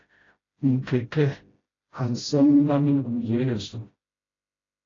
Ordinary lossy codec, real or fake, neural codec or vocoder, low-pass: AAC, 32 kbps; fake; codec, 16 kHz, 0.5 kbps, FreqCodec, smaller model; 7.2 kHz